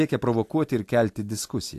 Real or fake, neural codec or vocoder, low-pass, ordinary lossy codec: real; none; 14.4 kHz; AAC, 64 kbps